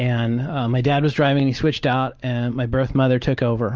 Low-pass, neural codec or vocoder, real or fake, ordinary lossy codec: 7.2 kHz; none; real; Opus, 24 kbps